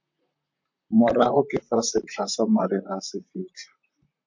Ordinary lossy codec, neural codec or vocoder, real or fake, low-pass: MP3, 48 kbps; vocoder, 44.1 kHz, 128 mel bands, Pupu-Vocoder; fake; 7.2 kHz